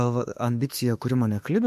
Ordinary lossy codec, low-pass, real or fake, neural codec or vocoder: MP3, 64 kbps; 14.4 kHz; fake; autoencoder, 48 kHz, 32 numbers a frame, DAC-VAE, trained on Japanese speech